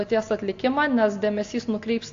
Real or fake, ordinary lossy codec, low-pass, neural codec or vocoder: real; AAC, 48 kbps; 7.2 kHz; none